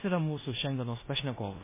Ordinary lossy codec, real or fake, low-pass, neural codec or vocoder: MP3, 16 kbps; fake; 3.6 kHz; codec, 16 kHz in and 24 kHz out, 0.9 kbps, LongCat-Audio-Codec, four codebook decoder